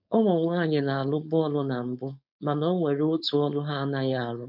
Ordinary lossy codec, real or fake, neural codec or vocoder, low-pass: none; fake; codec, 16 kHz, 4.8 kbps, FACodec; 5.4 kHz